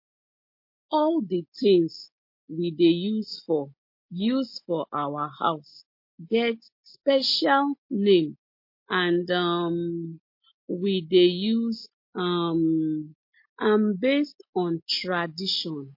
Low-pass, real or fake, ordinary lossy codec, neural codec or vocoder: 5.4 kHz; real; MP3, 32 kbps; none